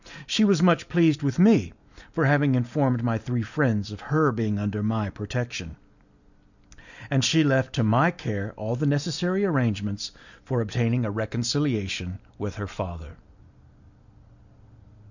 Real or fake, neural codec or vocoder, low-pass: real; none; 7.2 kHz